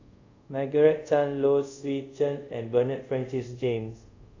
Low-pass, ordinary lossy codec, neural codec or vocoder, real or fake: 7.2 kHz; AAC, 48 kbps; codec, 24 kHz, 0.5 kbps, DualCodec; fake